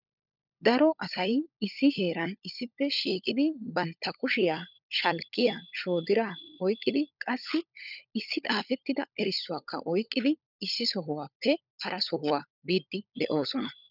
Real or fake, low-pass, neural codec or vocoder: fake; 5.4 kHz; codec, 16 kHz, 16 kbps, FunCodec, trained on LibriTTS, 50 frames a second